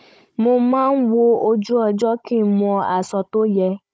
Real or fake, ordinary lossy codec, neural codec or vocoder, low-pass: fake; none; codec, 16 kHz, 16 kbps, FunCodec, trained on Chinese and English, 50 frames a second; none